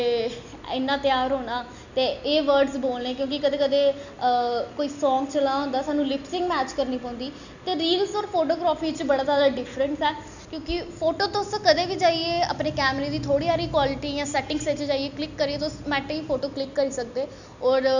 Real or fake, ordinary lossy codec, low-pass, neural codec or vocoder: real; none; 7.2 kHz; none